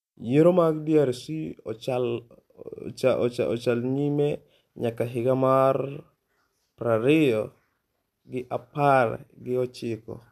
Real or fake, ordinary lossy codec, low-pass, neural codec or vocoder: real; MP3, 96 kbps; 14.4 kHz; none